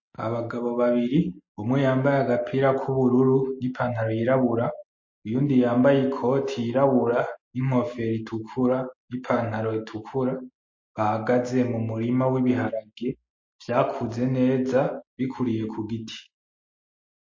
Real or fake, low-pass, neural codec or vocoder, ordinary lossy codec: real; 7.2 kHz; none; MP3, 32 kbps